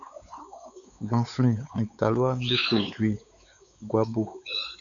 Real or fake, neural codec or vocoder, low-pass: fake; codec, 16 kHz, 4 kbps, X-Codec, WavLM features, trained on Multilingual LibriSpeech; 7.2 kHz